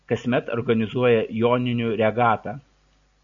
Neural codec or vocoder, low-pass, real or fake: none; 7.2 kHz; real